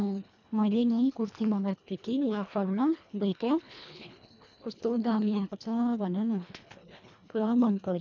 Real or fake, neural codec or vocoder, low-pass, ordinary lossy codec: fake; codec, 24 kHz, 1.5 kbps, HILCodec; 7.2 kHz; none